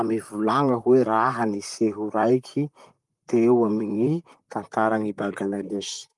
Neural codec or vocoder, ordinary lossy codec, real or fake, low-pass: vocoder, 44.1 kHz, 128 mel bands, Pupu-Vocoder; Opus, 32 kbps; fake; 10.8 kHz